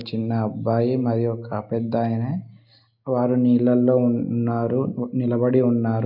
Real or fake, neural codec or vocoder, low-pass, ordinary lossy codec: real; none; 5.4 kHz; none